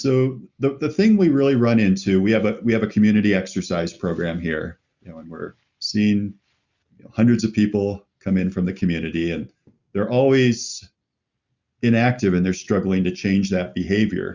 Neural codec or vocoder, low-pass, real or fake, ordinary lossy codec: none; 7.2 kHz; real; Opus, 64 kbps